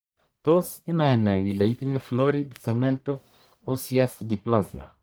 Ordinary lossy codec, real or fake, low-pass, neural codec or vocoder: none; fake; none; codec, 44.1 kHz, 1.7 kbps, Pupu-Codec